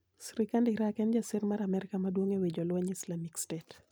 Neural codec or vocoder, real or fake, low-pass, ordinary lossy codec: none; real; none; none